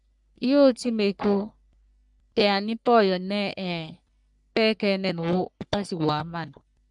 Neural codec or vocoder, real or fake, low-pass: codec, 44.1 kHz, 3.4 kbps, Pupu-Codec; fake; 10.8 kHz